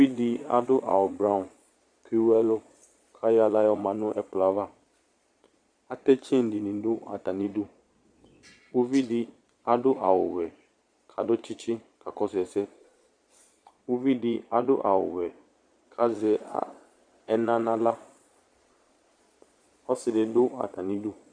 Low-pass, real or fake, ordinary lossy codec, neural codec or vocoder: 9.9 kHz; fake; Opus, 64 kbps; vocoder, 22.05 kHz, 80 mel bands, Vocos